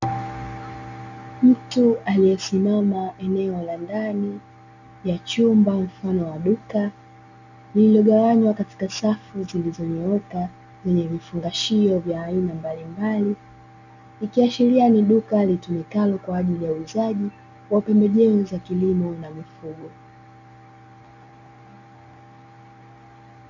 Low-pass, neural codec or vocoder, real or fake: 7.2 kHz; none; real